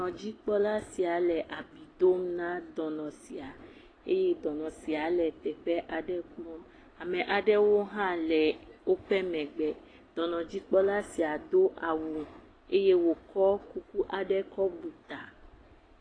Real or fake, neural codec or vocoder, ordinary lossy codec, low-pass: real; none; AAC, 32 kbps; 9.9 kHz